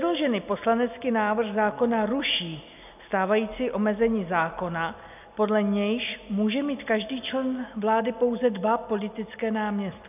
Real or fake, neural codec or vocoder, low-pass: real; none; 3.6 kHz